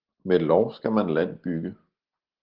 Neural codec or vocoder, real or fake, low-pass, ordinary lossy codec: none; real; 5.4 kHz; Opus, 16 kbps